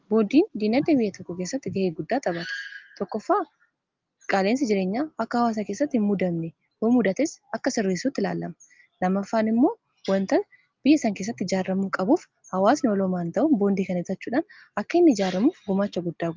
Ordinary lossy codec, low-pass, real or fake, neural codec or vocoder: Opus, 32 kbps; 7.2 kHz; real; none